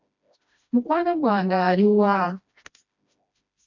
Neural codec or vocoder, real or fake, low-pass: codec, 16 kHz, 1 kbps, FreqCodec, smaller model; fake; 7.2 kHz